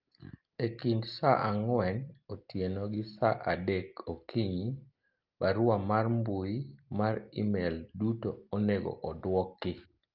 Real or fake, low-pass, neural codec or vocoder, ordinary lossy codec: real; 5.4 kHz; none; Opus, 32 kbps